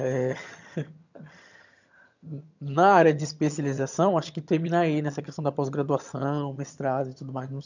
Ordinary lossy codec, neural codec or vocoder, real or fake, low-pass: none; vocoder, 22.05 kHz, 80 mel bands, HiFi-GAN; fake; 7.2 kHz